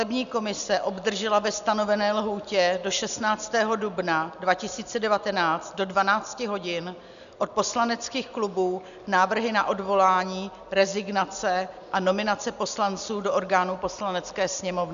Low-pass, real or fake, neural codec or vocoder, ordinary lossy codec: 7.2 kHz; real; none; MP3, 96 kbps